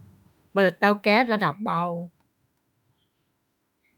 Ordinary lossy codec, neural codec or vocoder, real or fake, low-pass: none; autoencoder, 48 kHz, 32 numbers a frame, DAC-VAE, trained on Japanese speech; fake; 19.8 kHz